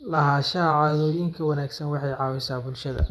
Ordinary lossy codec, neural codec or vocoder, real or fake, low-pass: none; vocoder, 24 kHz, 100 mel bands, Vocos; fake; none